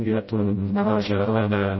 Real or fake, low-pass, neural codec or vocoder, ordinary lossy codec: fake; 7.2 kHz; codec, 16 kHz, 0.5 kbps, FreqCodec, smaller model; MP3, 24 kbps